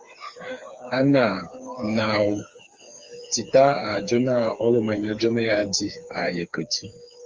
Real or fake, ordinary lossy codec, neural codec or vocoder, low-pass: fake; Opus, 32 kbps; codec, 16 kHz, 4 kbps, FreqCodec, smaller model; 7.2 kHz